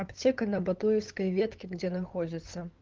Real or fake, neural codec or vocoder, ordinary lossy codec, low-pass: fake; codec, 16 kHz, 8 kbps, FunCodec, trained on LibriTTS, 25 frames a second; Opus, 16 kbps; 7.2 kHz